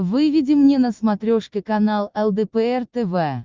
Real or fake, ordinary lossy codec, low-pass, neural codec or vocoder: real; Opus, 24 kbps; 7.2 kHz; none